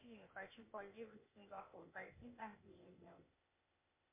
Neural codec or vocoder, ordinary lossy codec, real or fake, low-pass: codec, 16 kHz, 0.8 kbps, ZipCodec; MP3, 24 kbps; fake; 3.6 kHz